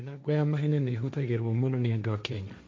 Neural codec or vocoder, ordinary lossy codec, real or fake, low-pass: codec, 16 kHz, 1.1 kbps, Voila-Tokenizer; none; fake; none